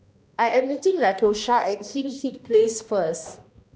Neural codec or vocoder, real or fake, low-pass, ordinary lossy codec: codec, 16 kHz, 1 kbps, X-Codec, HuBERT features, trained on balanced general audio; fake; none; none